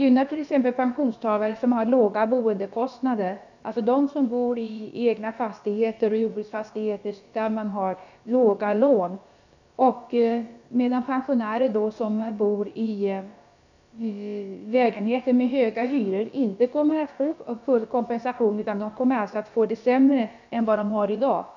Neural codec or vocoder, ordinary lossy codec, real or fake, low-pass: codec, 16 kHz, about 1 kbps, DyCAST, with the encoder's durations; none; fake; 7.2 kHz